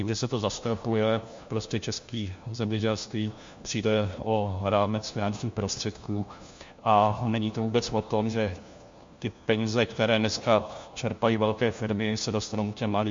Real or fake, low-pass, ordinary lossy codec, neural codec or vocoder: fake; 7.2 kHz; MP3, 48 kbps; codec, 16 kHz, 1 kbps, FunCodec, trained on LibriTTS, 50 frames a second